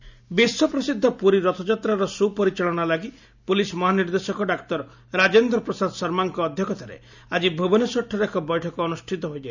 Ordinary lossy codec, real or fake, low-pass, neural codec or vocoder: none; real; 7.2 kHz; none